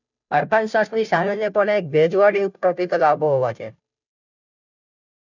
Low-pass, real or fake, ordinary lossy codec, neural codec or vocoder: 7.2 kHz; fake; none; codec, 16 kHz, 0.5 kbps, FunCodec, trained on Chinese and English, 25 frames a second